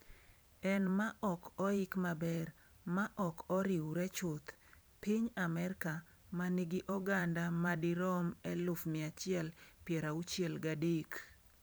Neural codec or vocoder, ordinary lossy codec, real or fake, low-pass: vocoder, 44.1 kHz, 128 mel bands every 512 samples, BigVGAN v2; none; fake; none